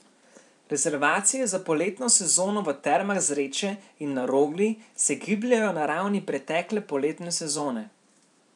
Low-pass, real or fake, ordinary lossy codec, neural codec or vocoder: 10.8 kHz; fake; none; vocoder, 44.1 kHz, 128 mel bands every 512 samples, BigVGAN v2